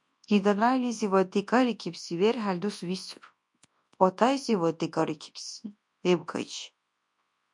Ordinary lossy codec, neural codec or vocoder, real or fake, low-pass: MP3, 64 kbps; codec, 24 kHz, 0.9 kbps, WavTokenizer, large speech release; fake; 10.8 kHz